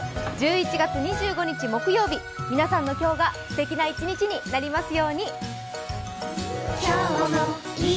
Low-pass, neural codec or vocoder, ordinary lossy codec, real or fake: none; none; none; real